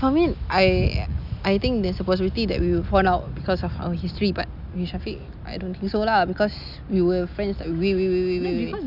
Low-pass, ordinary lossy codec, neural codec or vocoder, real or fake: 5.4 kHz; none; none; real